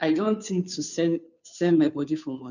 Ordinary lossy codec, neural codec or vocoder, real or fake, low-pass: none; codec, 16 kHz, 2 kbps, FunCodec, trained on Chinese and English, 25 frames a second; fake; 7.2 kHz